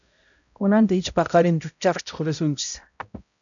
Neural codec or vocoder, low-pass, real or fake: codec, 16 kHz, 0.5 kbps, X-Codec, HuBERT features, trained on balanced general audio; 7.2 kHz; fake